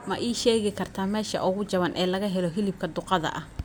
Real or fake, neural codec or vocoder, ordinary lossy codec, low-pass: real; none; none; none